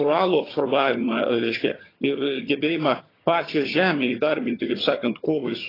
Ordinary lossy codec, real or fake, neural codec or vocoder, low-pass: AAC, 24 kbps; fake; vocoder, 22.05 kHz, 80 mel bands, HiFi-GAN; 5.4 kHz